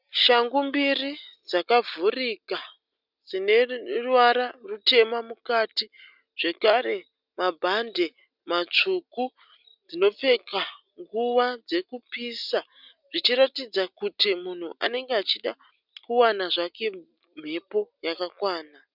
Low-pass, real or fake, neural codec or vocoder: 5.4 kHz; real; none